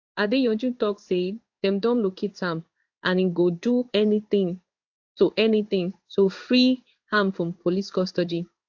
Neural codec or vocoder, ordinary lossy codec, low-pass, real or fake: codec, 16 kHz in and 24 kHz out, 1 kbps, XY-Tokenizer; AAC, 48 kbps; 7.2 kHz; fake